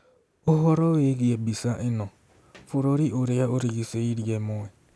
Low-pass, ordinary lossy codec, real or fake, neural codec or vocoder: none; none; real; none